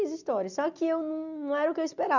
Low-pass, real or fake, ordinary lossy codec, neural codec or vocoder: 7.2 kHz; real; none; none